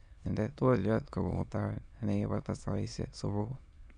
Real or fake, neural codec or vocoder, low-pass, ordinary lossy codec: fake; autoencoder, 22.05 kHz, a latent of 192 numbers a frame, VITS, trained on many speakers; 9.9 kHz; none